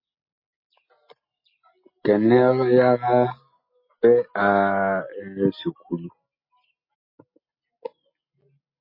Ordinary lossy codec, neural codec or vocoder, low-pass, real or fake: MP3, 24 kbps; none; 5.4 kHz; real